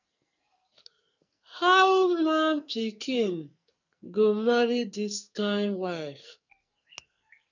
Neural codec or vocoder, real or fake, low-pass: codec, 44.1 kHz, 2.6 kbps, SNAC; fake; 7.2 kHz